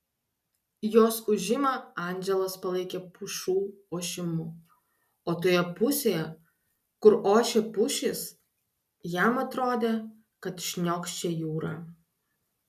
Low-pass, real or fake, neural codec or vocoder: 14.4 kHz; real; none